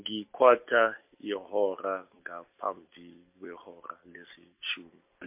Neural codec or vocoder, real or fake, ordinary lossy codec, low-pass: none; real; MP3, 32 kbps; 3.6 kHz